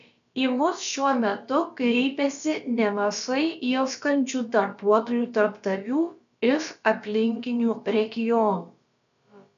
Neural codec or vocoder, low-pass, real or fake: codec, 16 kHz, about 1 kbps, DyCAST, with the encoder's durations; 7.2 kHz; fake